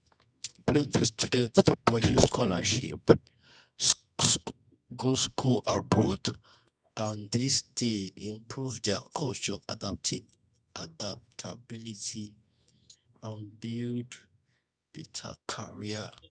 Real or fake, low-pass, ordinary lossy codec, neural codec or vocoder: fake; 9.9 kHz; none; codec, 24 kHz, 0.9 kbps, WavTokenizer, medium music audio release